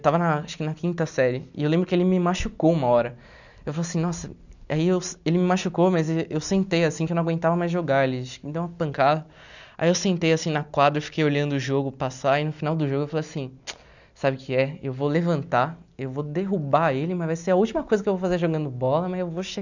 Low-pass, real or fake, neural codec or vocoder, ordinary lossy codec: 7.2 kHz; real; none; none